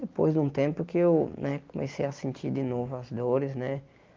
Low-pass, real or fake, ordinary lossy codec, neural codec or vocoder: 7.2 kHz; real; Opus, 32 kbps; none